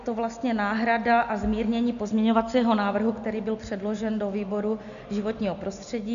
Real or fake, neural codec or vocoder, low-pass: real; none; 7.2 kHz